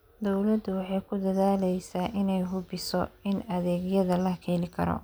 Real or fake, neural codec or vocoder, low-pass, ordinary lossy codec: real; none; none; none